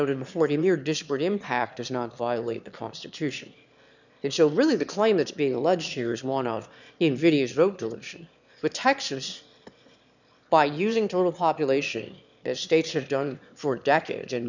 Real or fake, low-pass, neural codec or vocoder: fake; 7.2 kHz; autoencoder, 22.05 kHz, a latent of 192 numbers a frame, VITS, trained on one speaker